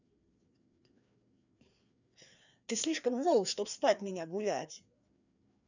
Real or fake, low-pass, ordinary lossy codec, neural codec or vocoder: fake; 7.2 kHz; none; codec, 16 kHz, 2 kbps, FreqCodec, larger model